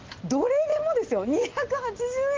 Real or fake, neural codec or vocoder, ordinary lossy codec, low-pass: real; none; Opus, 16 kbps; 7.2 kHz